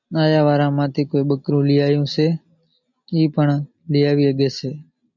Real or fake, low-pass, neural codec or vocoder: real; 7.2 kHz; none